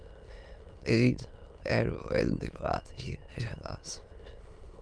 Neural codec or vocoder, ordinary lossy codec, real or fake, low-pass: autoencoder, 22.05 kHz, a latent of 192 numbers a frame, VITS, trained on many speakers; Opus, 32 kbps; fake; 9.9 kHz